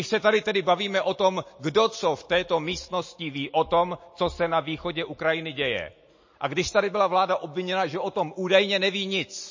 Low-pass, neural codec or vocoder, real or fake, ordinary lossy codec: 7.2 kHz; none; real; MP3, 32 kbps